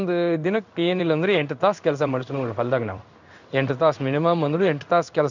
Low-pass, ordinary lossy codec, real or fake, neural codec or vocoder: 7.2 kHz; none; fake; codec, 16 kHz in and 24 kHz out, 1 kbps, XY-Tokenizer